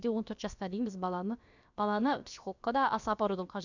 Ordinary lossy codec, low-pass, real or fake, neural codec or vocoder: none; 7.2 kHz; fake; codec, 16 kHz, about 1 kbps, DyCAST, with the encoder's durations